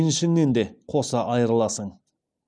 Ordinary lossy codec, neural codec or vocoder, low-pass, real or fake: none; none; 9.9 kHz; real